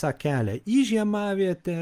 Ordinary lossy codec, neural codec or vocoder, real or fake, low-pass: Opus, 32 kbps; none; real; 14.4 kHz